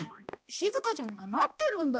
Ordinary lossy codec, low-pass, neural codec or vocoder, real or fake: none; none; codec, 16 kHz, 1 kbps, X-Codec, HuBERT features, trained on balanced general audio; fake